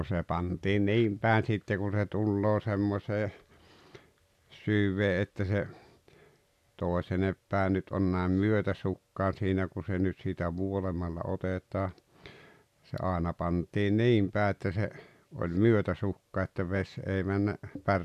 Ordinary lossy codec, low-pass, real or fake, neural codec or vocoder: none; 14.4 kHz; real; none